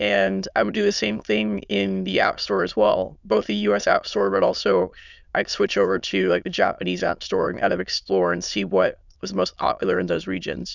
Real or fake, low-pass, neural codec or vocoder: fake; 7.2 kHz; autoencoder, 22.05 kHz, a latent of 192 numbers a frame, VITS, trained on many speakers